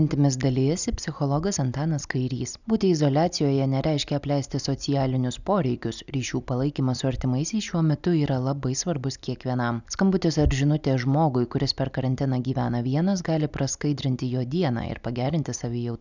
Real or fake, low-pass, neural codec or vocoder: real; 7.2 kHz; none